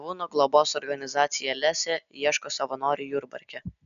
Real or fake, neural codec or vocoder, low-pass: real; none; 7.2 kHz